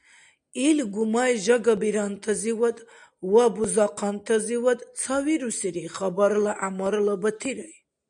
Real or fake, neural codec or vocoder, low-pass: real; none; 9.9 kHz